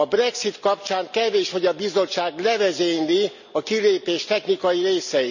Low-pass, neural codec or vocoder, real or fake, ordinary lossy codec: 7.2 kHz; none; real; none